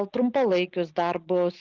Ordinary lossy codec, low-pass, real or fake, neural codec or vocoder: Opus, 24 kbps; 7.2 kHz; real; none